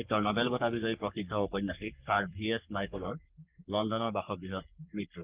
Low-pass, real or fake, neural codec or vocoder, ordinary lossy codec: 3.6 kHz; fake; codec, 44.1 kHz, 3.4 kbps, Pupu-Codec; Opus, 32 kbps